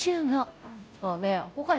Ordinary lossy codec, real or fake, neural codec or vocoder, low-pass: none; fake; codec, 16 kHz, 0.5 kbps, FunCodec, trained on Chinese and English, 25 frames a second; none